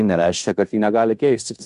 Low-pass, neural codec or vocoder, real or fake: 10.8 kHz; codec, 16 kHz in and 24 kHz out, 0.9 kbps, LongCat-Audio-Codec, fine tuned four codebook decoder; fake